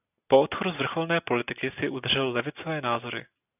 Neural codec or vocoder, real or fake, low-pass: none; real; 3.6 kHz